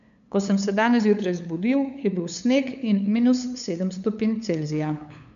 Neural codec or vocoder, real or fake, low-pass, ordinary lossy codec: codec, 16 kHz, 8 kbps, FunCodec, trained on LibriTTS, 25 frames a second; fake; 7.2 kHz; none